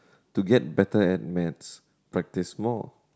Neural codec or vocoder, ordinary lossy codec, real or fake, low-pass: none; none; real; none